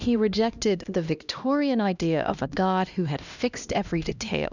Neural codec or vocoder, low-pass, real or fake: codec, 16 kHz, 1 kbps, X-Codec, HuBERT features, trained on LibriSpeech; 7.2 kHz; fake